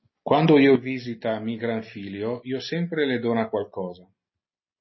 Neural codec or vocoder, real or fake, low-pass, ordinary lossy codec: none; real; 7.2 kHz; MP3, 24 kbps